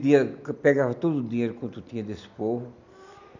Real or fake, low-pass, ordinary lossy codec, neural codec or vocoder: real; 7.2 kHz; none; none